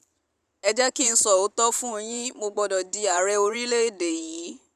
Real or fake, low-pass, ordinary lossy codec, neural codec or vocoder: fake; 14.4 kHz; none; vocoder, 44.1 kHz, 128 mel bands every 512 samples, BigVGAN v2